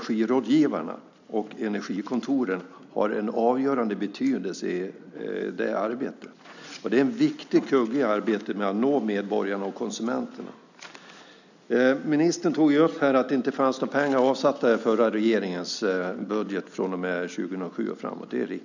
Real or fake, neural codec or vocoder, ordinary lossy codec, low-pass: real; none; none; 7.2 kHz